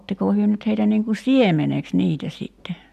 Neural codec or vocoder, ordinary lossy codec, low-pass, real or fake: none; AAC, 96 kbps; 14.4 kHz; real